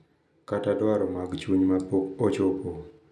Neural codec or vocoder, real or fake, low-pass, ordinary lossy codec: none; real; none; none